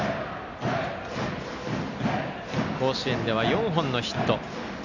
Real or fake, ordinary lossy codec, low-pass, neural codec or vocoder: real; none; 7.2 kHz; none